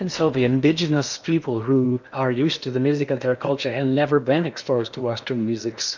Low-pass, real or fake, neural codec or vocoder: 7.2 kHz; fake; codec, 16 kHz in and 24 kHz out, 0.8 kbps, FocalCodec, streaming, 65536 codes